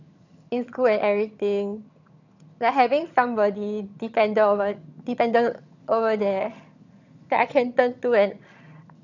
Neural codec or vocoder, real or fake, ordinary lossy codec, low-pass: vocoder, 22.05 kHz, 80 mel bands, HiFi-GAN; fake; none; 7.2 kHz